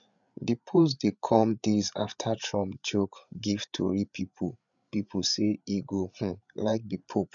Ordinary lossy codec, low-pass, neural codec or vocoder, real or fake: none; 7.2 kHz; codec, 16 kHz, 8 kbps, FreqCodec, larger model; fake